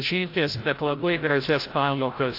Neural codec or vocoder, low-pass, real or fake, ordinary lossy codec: codec, 16 kHz, 0.5 kbps, FreqCodec, larger model; 5.4 kHz; fake; AAC, 32 kbps